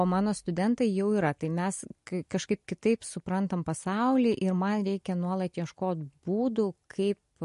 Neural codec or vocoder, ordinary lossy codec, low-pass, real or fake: none; MP3, 48 kbps; 14.4 kHz; real